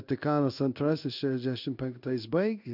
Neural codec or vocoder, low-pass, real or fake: codec, 16 kHz in and 24 kHz out, 1 kbps, XY-Tokenizer; 5.4 kHz; fake